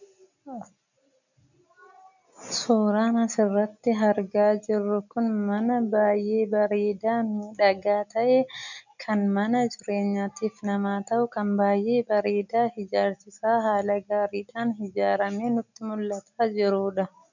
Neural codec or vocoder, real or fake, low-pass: none; real; 7.2 kHz